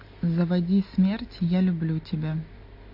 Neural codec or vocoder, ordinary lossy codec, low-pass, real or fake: none; MP3, 32 kbps; 5.4 kHz; real